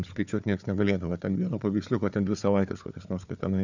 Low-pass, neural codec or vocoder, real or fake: 7.2 kHz; codec, 16 kHz, 4 kbps, FunCodec, trained on Chinese and English, 50 frames a second; fake